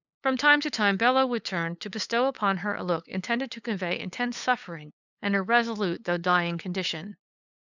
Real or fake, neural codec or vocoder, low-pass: fake; codec, 16 kHz, 8 kbps, FunCodec, trained on LibriTTS, 25 frames a second; 7.2 kHz